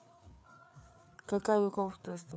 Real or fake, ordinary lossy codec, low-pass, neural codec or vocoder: fake; none; none; codec, 16 kHz, 4 kbps, FreqCodec, larger model